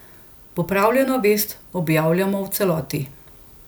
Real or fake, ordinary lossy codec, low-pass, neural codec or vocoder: real; none; none; none